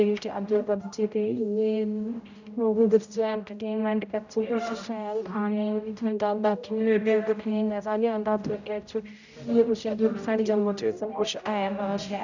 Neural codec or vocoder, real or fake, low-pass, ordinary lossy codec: codec, 16 kHz, 0.5 kbps, X-Codec, HuBERT features, trained on general audio; fake; 7.2 kHz; none